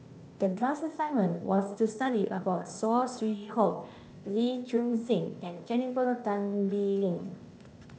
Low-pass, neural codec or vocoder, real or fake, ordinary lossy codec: none; codec, 16 kHz, 0.8 kbps, ZipCodec; fake; none